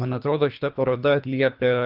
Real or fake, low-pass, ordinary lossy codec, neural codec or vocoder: fake; 5.4 kHz; Opus, 32 kbps; codec, 24 kHz, 3 kbps, HILCodec